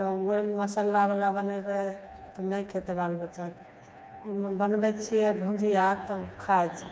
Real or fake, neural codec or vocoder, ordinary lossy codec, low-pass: fake; codec, 16 kHz, 2 kbps, FreqCodec, smaller model; none; none